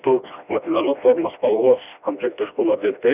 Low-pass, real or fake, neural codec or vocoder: 3.6 kHz; fake; codec, 16 kHz, 1 kbps, FreqCodec, smaller model